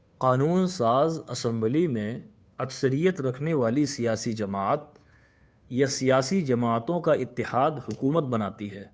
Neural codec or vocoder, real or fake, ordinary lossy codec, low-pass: codec, 16 kHz, 2 kbps, FunCodec, trained on Chinese and English, 25 frames a second; fake; none; none